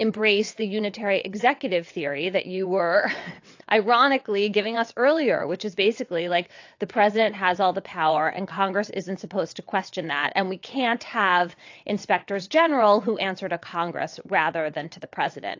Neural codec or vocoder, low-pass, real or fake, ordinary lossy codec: vocoder, 22.05 kHz, 80 mel bands, WaveNeXt; 7.2 kHz; fake; AAC, 48 kbps